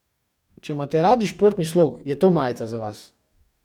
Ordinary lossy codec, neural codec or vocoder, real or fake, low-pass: none; codec, 44.1 kHz, 2.6 kbps, DAC; fake; 19.8 kHz